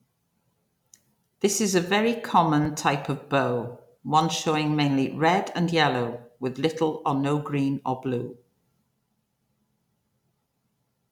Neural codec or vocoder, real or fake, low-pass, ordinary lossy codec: none; real; 19.8 kHz; none